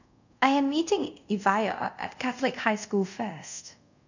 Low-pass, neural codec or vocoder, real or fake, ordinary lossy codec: 7.2 kHz; codec, 24 kHz, 0.5 kbps, DualCodec; fake; none